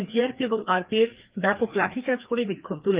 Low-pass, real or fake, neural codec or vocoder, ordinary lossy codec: 3.6 kHz; fake; codec, 16 kHz, 2 kbps, FreqCodec, larger model; Opus, 32 kbps